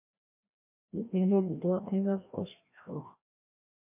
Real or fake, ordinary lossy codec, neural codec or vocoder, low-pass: fake; MP3, 32 kbps; codec, 16 kHz, 1 kbps, FreqCodec, larger model; 3.6 kHz